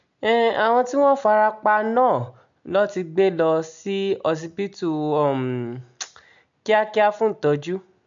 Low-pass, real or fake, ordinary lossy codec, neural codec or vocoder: 7.2 kHz; real; MP3, 64 kbps; none